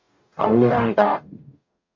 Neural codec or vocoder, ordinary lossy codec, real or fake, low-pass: codec, 44.1 kHz, 0.9 kbps, DAC; MP3, 48 kbps; fake; 7.2 kHz